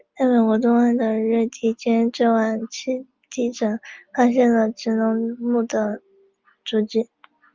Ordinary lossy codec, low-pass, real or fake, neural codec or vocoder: Opus, 24 kbps; 7.2 kHz; real; none